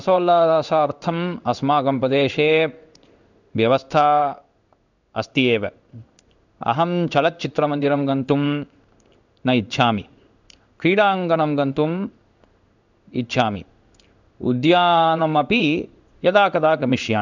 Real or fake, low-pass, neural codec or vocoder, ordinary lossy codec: fake; 7.2 kHz; codec, 16 kHz in and 24 kHz out, 1 kbps, XY-Tokenizer; none